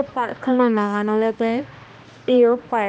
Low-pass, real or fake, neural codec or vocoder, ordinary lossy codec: none; fake; codec, 16 kHz, 1 kbps, X-Codec, HuBERT features, trained on balanced general audio; none